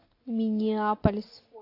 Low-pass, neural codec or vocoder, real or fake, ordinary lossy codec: 5.4 kHz; none; real; AAC, 24 kbps